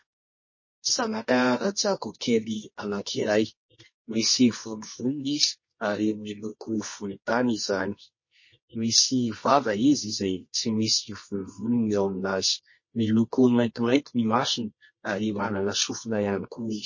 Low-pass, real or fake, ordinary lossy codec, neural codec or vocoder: 7.2 kHz; fake; MP3, 32 kbps; codec, 24 kHz, 0.9 kbps, WavTokenizer, medium music audio release